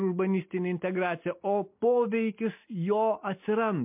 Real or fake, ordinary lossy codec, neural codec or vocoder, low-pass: real; MP3, 32 kbps; none; 3.6 kHz